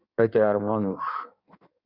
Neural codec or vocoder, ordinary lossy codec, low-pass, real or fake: codec, 16 kHz in and 24 kHz out, 1.1 kbps, FireRedTTS-2 codec; Opus, 64 kbps; 5.4 kHz; fake